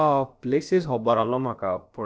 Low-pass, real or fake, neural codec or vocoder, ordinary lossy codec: none; fake; codec, 16 kHz, about 1 kbps, DyCAST, with the encoder's durations; none